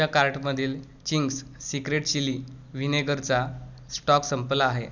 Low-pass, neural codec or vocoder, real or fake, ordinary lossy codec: 7.2 kHz; none; real; none